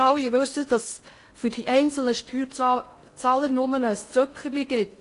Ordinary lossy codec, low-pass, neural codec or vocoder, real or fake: AAC, 48 kbps; 10.8 kHz; codec, 16 kHz in and 24 kHz out, 0.6 kbps, FocalCodec, streaming, 4096 codes; fake